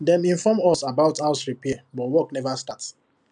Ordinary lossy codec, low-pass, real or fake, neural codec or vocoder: none; 9.9 kHz; real; none